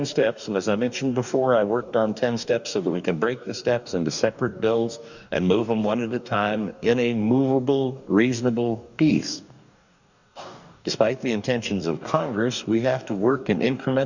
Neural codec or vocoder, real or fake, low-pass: codec, 44.1 kHz, 2.6 kbps, DAC; fake; 7.2 kHz